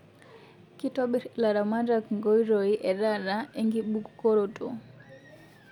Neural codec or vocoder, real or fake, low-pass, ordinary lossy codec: none; real; 19.8 kHz; none